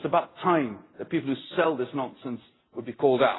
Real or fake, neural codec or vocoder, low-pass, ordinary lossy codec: real; none; 7.2 kHz; AAC, 16 kbps